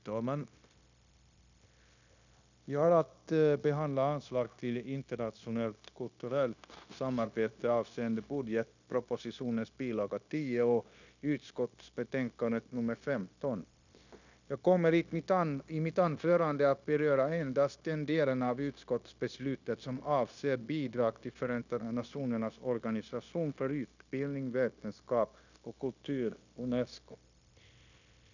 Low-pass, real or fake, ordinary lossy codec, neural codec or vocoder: 7.2 kHz; fake; none; codec, 16 kHz, 0.9 kbps, LongCat-Audio-Codec